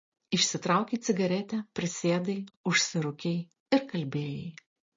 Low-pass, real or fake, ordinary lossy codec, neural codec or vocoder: 7.2 kHz; real; MP3, 32 kbps; none